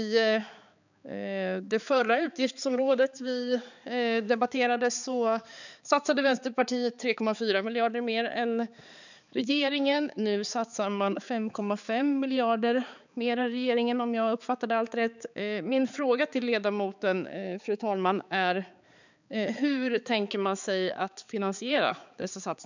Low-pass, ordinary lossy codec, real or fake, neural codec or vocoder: 7.2 kHz; none; fake; codec, 16 kHz, 4 kbps, X-Codec, HuBERT features, trained on balanced general audio